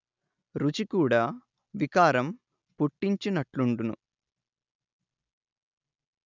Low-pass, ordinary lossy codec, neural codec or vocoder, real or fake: 7.2 kHz; none; none; real